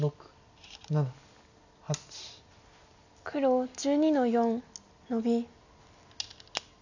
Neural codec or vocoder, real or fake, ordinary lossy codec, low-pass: none; real; none; 7.2 kHz